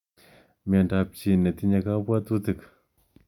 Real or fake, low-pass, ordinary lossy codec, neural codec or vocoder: real; 19.8 kHz; MP3, 96 kbps; none